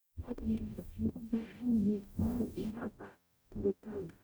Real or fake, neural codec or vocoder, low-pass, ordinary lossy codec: fake; codec, 44.1 kHz, 0.9 kbps, DAC; none; none